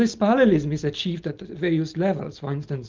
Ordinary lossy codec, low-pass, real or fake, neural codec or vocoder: Opus, 24 kbps; 7.2 kHz; real; none